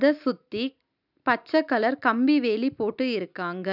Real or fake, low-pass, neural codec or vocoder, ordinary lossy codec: real; 5.4 kHz; none; none